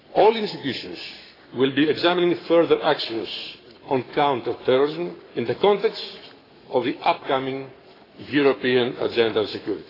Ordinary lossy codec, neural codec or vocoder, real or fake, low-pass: AAC, 24 kbps; codec, 44.1 kHz, 7.8 kbps, Pupu-Codec; fake; 5.4 kHz